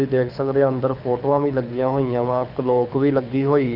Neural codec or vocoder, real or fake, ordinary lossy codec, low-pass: codec, 24 kHz, 6 kbps, HILCodec; fake; none; 5.4 kHz